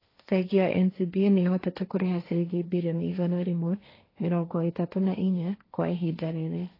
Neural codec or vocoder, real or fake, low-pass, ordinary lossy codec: codec, 16 kHz, 1.1 kbps, Voila-Tokenizer; fake; 5.4 kHz; AAC, 24 kbps